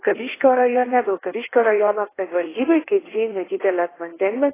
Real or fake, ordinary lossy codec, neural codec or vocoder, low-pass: fake; AAC, 16 kbps; codec, 16 kHz in and 24 kHz out, 1.1 kbps, FireRedTTS-2 codec; 3.6 kHz